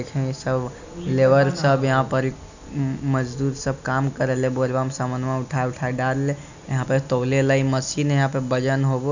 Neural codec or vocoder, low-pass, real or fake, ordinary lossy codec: none; 7.2 kHz; real; none